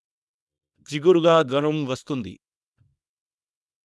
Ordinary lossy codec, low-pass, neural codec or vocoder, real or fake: none; none; codec, 24 kHz, 0.9 kbps, WavTokenizer, small release; fake